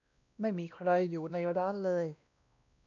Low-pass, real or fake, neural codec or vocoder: 7.2 kHz; fake; codec, 16 kHz, 1 kbps, X-Codec, WavLM features, trained on Multilingual LibriSpeech